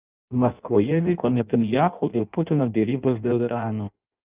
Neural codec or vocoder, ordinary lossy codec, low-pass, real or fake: codec, 16 kHz in and 24 kHz out, 0.6 kbps, FireRedTTS-2 codec; Opus, 24 kbps; 3.6 kHz; fake